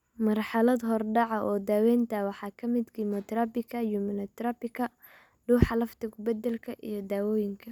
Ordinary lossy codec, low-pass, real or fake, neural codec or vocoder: none; 19.8 kHz; real; none